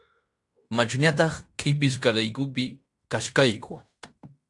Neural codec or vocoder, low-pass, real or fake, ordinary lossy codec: codec, 16 kHz in and 24 kHz out, 0.9 kbps, LongCat-Audio-Codec, fine tuned four codebook decoder; 10.8 kHz; fake; AAC, 64 kbps